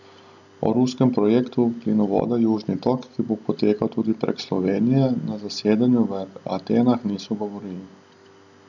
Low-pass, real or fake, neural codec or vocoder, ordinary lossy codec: 7.2 kHz; real; none; none